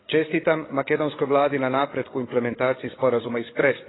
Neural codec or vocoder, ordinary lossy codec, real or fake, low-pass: codec, 16 kHz, 8 kbps, FreqCodec, larger model; AAC, 16 kbps; fake; 7.2 kHz